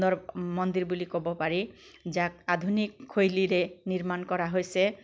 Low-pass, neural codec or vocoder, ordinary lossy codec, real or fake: none; none; none; real